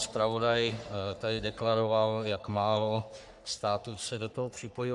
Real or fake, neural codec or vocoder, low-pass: fake; codec, 44.1 kHz, 3.4 kbps, Pupu-Codec; 10.8 kHz